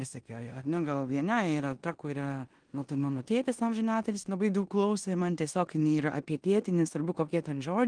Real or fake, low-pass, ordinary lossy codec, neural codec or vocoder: fake; 9.9 kHz; Opus, 24 kbps; codec, 16 kHz in and 24 kHz out, 0.9 kbps, LongCat-Audio-Codec, four codebook decoder